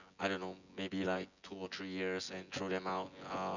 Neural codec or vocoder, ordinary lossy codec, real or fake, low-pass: vocoder, 24 kHz, 100 mel bands, Vocos; none; fake; 7.2 kHz